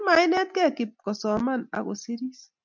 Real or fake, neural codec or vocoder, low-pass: real; none; 7.2 kHz